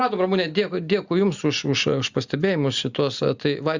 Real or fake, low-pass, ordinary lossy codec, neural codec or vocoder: real; 7.2 kHz; Opus, 64 kbps; none